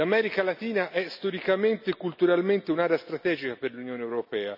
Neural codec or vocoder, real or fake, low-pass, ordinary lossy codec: none; real; 5.4 kHz; none